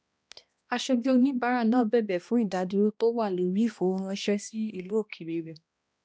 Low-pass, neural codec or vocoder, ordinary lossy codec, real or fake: none; codec, 16 kHz, 1 kbps, X-Codec, HuBERT features, trained on balanced general audio; none; fake